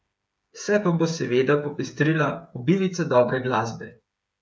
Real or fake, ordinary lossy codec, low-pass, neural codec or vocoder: fake; none; none; codec, 16 kHz, 8 kbps, FreqCodec, smaller model